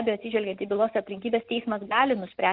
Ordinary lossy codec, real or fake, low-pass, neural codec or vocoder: Opus, 24 kbps; real; 5.4 kHz; none